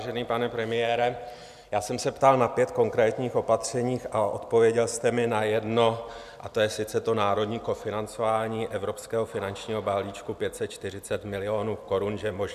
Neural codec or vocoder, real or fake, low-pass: none; real; 14.4 kHz